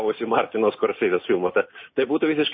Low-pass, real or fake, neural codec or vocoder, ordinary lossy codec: 7.2 kHz; real; none; MP3, 24 kbps